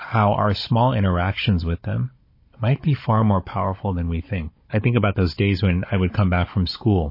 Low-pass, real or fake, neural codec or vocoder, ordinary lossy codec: 5.4 kHz; fake; codec, 16 kHz, 16 kbps, FunCodec, trained on Chinese and English, 50 frames a second; MP3, 24 kbps